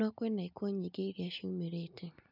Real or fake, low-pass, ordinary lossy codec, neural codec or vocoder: real; 5.4 kHz; none; none